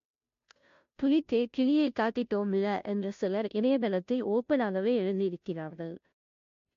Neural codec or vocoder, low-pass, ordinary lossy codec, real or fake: codec, 16 kHz, 0.5 kbps, FunCodec, trained on Chinese and English, 25 frames a second; 7.2 kHz; MP3, 48 kbps; fake